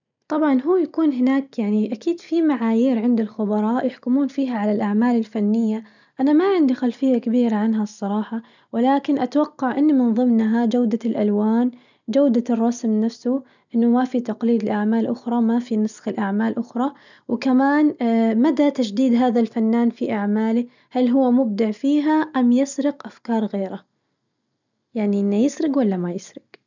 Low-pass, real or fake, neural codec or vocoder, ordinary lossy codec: 7.2 kHz; real; none; none